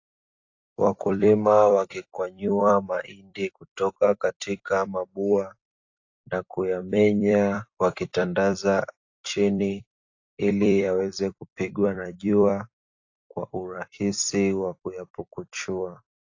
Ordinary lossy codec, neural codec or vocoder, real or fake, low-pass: AAC, 48 kbps; vocoder, 44.1 kHz, 128 mel bands every 256 samples, BigVGAN v2; fake; 7.2 kHz